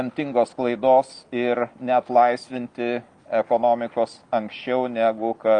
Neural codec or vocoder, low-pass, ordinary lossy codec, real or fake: none; 9.9 kHz; Opus, 32 kbps; real